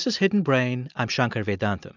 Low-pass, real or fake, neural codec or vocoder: 7.2 kHz; real; none